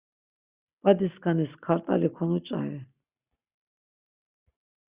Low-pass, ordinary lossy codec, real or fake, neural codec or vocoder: 3.6 kHz; Opus, 64 kbps; real; none